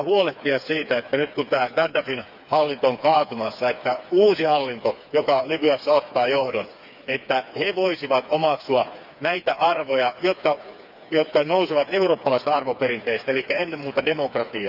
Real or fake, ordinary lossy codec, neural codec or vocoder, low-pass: fake; none; codec, 16 kHz, 4 kbps, FreqCodec, smaller model; 5.4 kHz